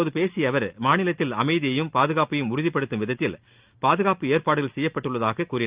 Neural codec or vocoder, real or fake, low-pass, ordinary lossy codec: none; real; 3.6 kHz; Opus, 24 kbps